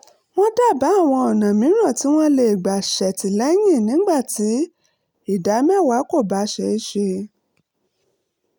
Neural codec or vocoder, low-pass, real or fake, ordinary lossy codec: none; none; real; none